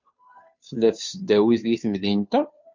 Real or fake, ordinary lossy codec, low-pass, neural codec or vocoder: fake; MP3, 48 kbps; 7.2 kHz; codec, 16 kHz, 2 kbps, FunCodec, trained on Chinese and English, 25 frames a second